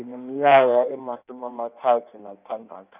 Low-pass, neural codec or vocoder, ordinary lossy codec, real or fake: 3.6 kHz; codec, 16 kHz in and 24 kHz out, 1.1 kbps, FireRedTTS-2 codec; none; fake